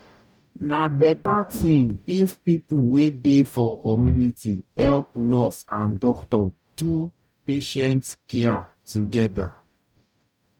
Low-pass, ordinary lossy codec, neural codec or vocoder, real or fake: 19.8 kHz; none; codec, 44.1 kHz, 0.9 kbps, DAC; fake